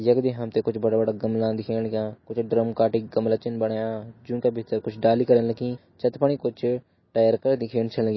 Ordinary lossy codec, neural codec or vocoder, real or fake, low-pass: MP3, 24 kbps; none; real; 7.2 kHz